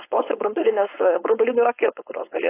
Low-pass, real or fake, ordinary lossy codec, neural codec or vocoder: 3.6 kHz; fake; AAC, 24 kbps; codec, 16 kHz, 4.8 kbps, FACodec